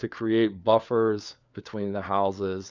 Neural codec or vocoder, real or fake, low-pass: codec, 16 kHz, 4 kbps, FunCodec, trained on LibriTTS, 50 frames a second; fake; 7.2 kHz